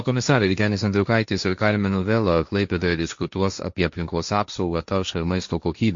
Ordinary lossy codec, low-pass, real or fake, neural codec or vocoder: MP3, 48 kbps; 7.2 kHz; fake; codec, 16 kHz, 1.1 kbps, Voila-Tokenizer